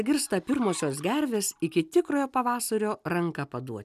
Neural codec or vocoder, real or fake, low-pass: none; real; 14.4 kHz